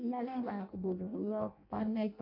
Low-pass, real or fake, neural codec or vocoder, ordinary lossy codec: 5.4 kHz; fake; codec, 16 kHz in and 24 kHz out, 0.6 kbps, FireRedTTS-2 codec; AAC, 48 kbps